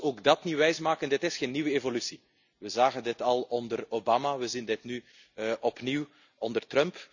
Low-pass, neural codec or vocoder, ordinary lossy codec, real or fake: 7.2 kHz; none; none; real